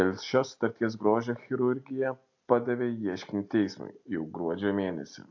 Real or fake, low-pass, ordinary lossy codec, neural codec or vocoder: real; 7.2 kHz; AAC, 48 kbps; none